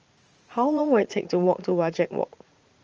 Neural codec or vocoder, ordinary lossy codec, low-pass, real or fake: vocoder, 44.1 kHz, 80 mel bands, Vocos; Opus, 24 kbps; 7.2 kHz; fake